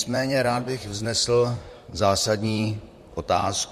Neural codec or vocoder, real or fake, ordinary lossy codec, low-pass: vocoder, 44.1 kHz, 128 mel bands, Pupu-Vocoder; fake; MP3, 64 kbps; 14.4 kHz